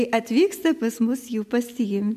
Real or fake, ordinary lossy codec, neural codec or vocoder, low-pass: real; MP3, 96 kbps; none; 14.4 kHz